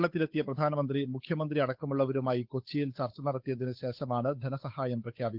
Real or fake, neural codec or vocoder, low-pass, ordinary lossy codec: fake; codec, 16 kHz, 8 kbps, FunCodec, trained on Chinese and English, 25 frames a second; 5.4 kHz; Opus, 32 kbps